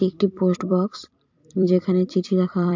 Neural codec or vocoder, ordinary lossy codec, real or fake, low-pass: vocoder, 44.1 kHz, 128 mel bands every 256 samples, BigVGAN v2; MP3, 48 kbps; fake; 7.2 kHz